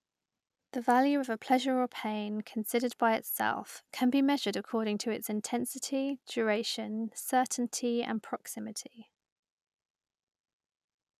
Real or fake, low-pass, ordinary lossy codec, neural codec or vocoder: real; 14.4 kHz; none; none